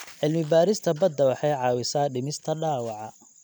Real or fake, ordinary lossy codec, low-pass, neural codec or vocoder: real; none; none; none